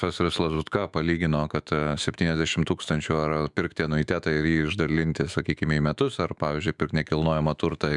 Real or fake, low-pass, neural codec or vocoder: fake; 10.8 kHz; autoencoder, 48 kHz, 128 numbers a frame, DAC-VAE, trained on Japanese speech